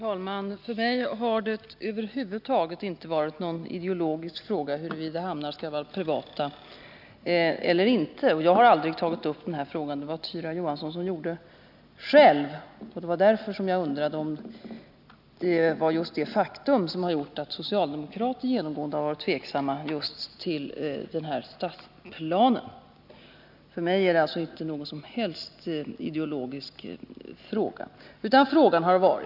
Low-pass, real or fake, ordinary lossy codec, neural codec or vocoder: 5.4 kHz; real; none; none